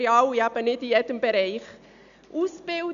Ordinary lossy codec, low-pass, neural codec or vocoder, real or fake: none; 7.2 kHz; none; real